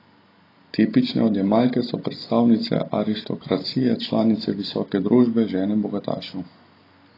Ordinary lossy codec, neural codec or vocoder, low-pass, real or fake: AAC, 24 kbps; none; 5.4 kHz; real